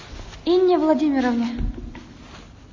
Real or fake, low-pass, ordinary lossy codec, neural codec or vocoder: real; 7.2 kHz; MP3, 32 kbps; none